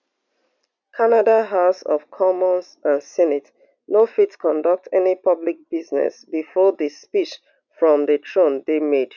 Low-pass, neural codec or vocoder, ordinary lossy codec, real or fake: 7.2 kHz; none; none; real